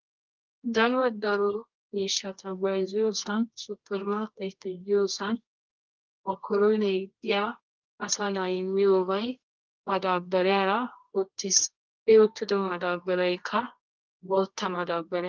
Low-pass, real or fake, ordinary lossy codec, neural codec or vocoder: 7.2 kHz; fake; Opus, 32 kbps; codec, 24 kHz, 0.9 kbps, WavTokenizer, medium music audio release